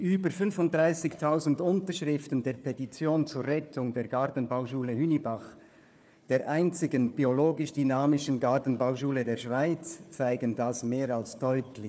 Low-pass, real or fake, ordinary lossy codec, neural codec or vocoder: none; fake; none; codec, 16 kHz, 4 kbps, FunCodec, trained on Chinese and English, 50 frames a second